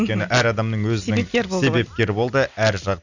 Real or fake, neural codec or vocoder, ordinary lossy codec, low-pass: real; none; none; 7.2 kHz